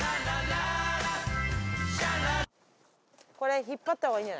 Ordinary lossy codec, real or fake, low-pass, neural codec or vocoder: none; real; none; none